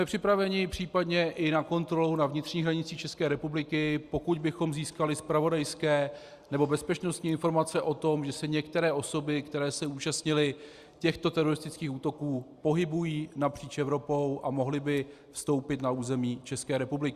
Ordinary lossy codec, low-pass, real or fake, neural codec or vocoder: Opus, 64 kbps; 14.4 kHz; real; none